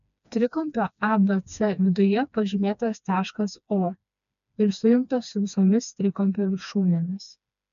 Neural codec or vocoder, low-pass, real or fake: codec, 16 kHz, 2 kbps, FreqCodec, smaller model; 7.2 kHz; fake